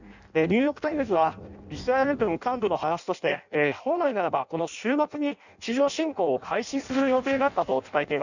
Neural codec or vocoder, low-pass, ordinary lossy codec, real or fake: codec, 16 kHz in and 24 kHz out, 0.6 kbps, FireRedTTS-2 codec; 7.2 kHz; none; fake